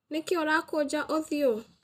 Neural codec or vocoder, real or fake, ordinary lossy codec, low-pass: none; real; none; 14.4 kHz